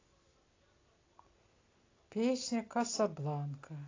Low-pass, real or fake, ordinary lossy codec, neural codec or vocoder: 7.2 kHz; real; AAC, 32 kbps; none